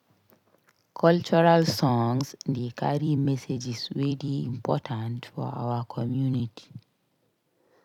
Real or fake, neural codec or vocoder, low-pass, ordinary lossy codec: fake; vocoder, 44.1 kHz, 128 mel bands every 256 samples, BigVGAN v2; 19.8 kHz; none